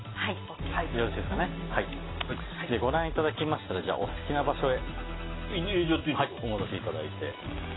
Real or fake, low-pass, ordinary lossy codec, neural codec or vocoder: real; 7.2 kHz; AAC, 16 kbps; none